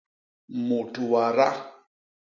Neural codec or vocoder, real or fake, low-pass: none; real; 7.2 kHz